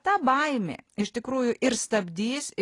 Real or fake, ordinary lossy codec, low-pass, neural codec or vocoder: real; AAC, 32 kbps; 10.8 kHz; none